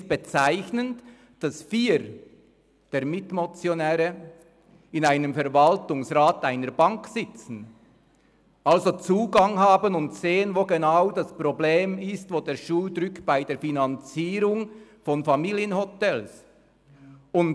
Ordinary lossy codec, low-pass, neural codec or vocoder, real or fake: none; none; none; real